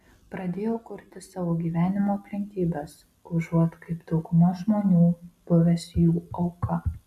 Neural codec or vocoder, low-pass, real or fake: none; 14.4 kHz; real